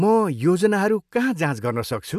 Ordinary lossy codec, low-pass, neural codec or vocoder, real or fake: none; 14.4 kHz; none; real